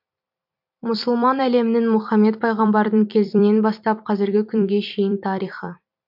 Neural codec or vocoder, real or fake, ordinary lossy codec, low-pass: vocoder, 44.1 kHz, 128 mel bands every 256 samples, BigVGAN v2; fake; MP3, 48 kbps; 5.4 kHz